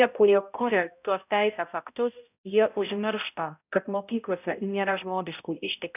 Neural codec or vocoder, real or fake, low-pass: codec, 16 kHz, 0.5 kbps, X-Codec, HuBERT features, trained on balanced general audio; fake; 3.6 kHz